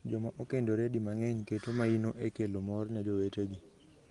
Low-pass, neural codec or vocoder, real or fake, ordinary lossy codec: 10.8 kHz; none; real; Opus, 24 kbps